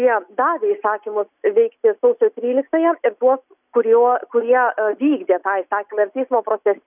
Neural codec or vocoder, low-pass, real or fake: none; 3.6 kHz; real